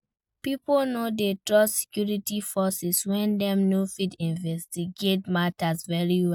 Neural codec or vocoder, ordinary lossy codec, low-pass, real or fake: none; none; 19.8 kHz; real